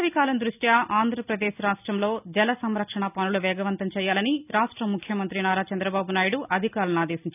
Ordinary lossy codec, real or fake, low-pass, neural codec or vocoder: none; real; 3.6 kHz; none